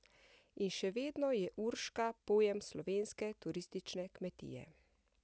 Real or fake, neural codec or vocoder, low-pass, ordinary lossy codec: real; none; none; none